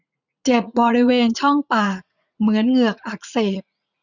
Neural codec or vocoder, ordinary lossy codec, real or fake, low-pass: vocoder, 44.1 kHz, 80 mel bands, Vocos; none; fake; 7.2 kHz